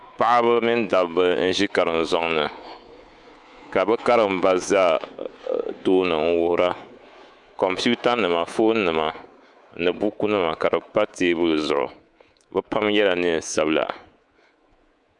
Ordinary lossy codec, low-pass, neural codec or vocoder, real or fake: Opus, 64 kbps; 10.8 kHz; codec, 24 kHz, 3.1 kbps, DualCodec; fake